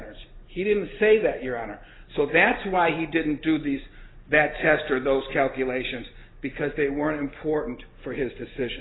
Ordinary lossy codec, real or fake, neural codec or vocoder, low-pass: AAC, 16 kbps; real; none; 7.2 kHz